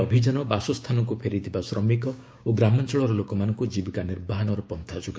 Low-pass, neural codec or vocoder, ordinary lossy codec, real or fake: none; codec, 16 kHz, 6 kbps, DAC; none; fake